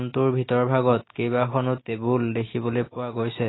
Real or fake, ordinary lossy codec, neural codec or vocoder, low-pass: real; AAC, 16 kbps; none; 7.2 kHz